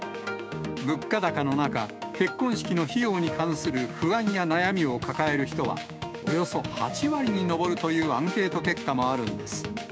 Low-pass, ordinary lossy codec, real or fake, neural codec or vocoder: none; none; fake; codec, 16 kHz, 6 kbps, DAC